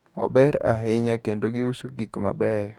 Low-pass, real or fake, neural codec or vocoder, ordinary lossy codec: 19.8 kHz; fake; codec, 44.1 kHz, 2.6 kbps, DAC; none